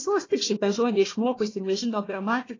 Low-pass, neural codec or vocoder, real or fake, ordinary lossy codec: 7.2 kHz; codec, 16 kHz, 1 kbps, FunCodec, trained on Chinese and English, 50 frames a second; fake; AAC, 32 kbps